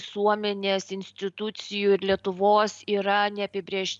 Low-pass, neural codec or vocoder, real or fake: 10.8 kHz; none; real